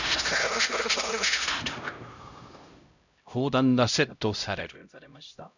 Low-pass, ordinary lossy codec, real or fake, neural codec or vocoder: 7.2 kHz; none; fake; codec, 16 kHz, 0.5 kbps, X-Codec, HuBERT features, trained on LibriSpeech